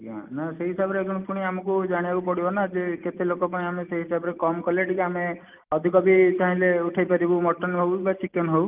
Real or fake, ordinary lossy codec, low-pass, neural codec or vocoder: real; Opus, 24 kbps; 3.6 kHz; none